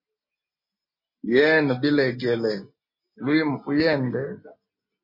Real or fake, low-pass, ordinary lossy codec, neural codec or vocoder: real; 5.4 kHz; MP3, 32 kbps; none